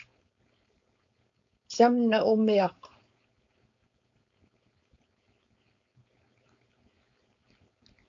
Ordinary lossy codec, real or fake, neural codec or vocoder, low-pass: AAC, 64 kbps; fake; codec, 16 kHz, 4.8 kbps, FACodec; 7.2 kHz